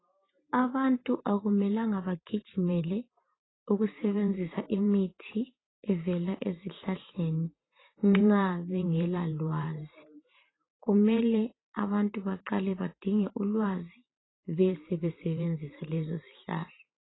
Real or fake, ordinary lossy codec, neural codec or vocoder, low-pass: fake; AAC, 16 kbps; vocoder, 44.1 kHz, 128 mel bands every 512 samples, BigVGAN v2; 7.2 kHz